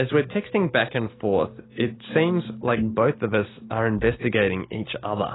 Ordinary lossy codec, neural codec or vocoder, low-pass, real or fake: AAC, 16 kbps; none; 7.2 kHz; real